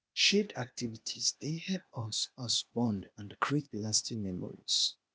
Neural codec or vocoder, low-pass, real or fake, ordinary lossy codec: codec, 16 kHz, 0.8 kbps, ZipCodec; none; fake; none